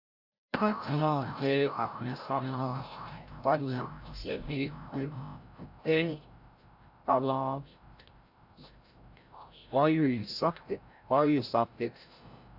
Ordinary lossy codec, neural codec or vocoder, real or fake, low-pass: AAC, 48 kbps; codec, 16 kHz, 0.5 kbps, FreqCodec, larger model; fake; 5.4 kHz